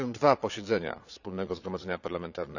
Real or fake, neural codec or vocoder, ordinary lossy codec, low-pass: fake; vocoder, 22.05 kHz, 80 mel bands, Vocos; none; 7.2 kHz